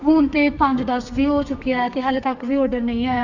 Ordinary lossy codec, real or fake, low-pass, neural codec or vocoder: none; fake; 7.2 kHz; codec, 32 kHz, 1.9 kbps, SNAC